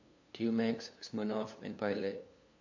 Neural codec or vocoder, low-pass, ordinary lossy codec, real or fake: codec, 16 kHz, 2 kbps, FunCodec, trained on LibriTTS, 25 frames a second; 7.2 kHz; AAC, 48 kbps; fake